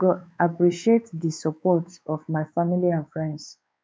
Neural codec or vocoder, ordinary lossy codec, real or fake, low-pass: codec, 16 kHz, 4 kbps, X-Codec, WavLM features, trained on Multilingual LibriSpeech; none; fake; none